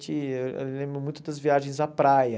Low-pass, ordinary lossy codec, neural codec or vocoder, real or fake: none; none; none; real